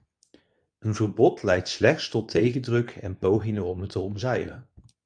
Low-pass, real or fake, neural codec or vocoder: 9.9 kHz; fake; codec, 24 kHz, 0.9 kbps, WavTokenizer, medium speech release version 2